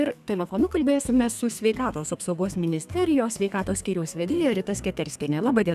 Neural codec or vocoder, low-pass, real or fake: codec, 32 kHz, 1.9 kbps, SNAC; 14.4 kHz; fake